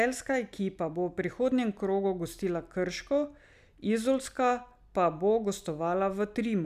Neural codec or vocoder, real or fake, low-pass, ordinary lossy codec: none; real; 14.4 kHz; none